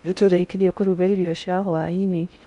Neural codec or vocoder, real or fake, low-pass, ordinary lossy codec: codec, 16 kHz in and 24 kHz out, 0.6 kbps, FocalCodec, streaming, 4096 codes; fake; 10.8 kHz; none